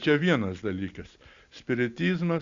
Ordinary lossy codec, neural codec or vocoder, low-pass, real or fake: Opus, 64 kbps; none; 7.2 kHz; real